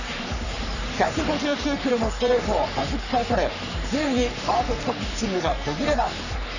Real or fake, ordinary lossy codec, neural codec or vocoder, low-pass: fake; none; codec, 44.1 kHz, 3.4 kbps, Pupu-Codec; 7.2 kHz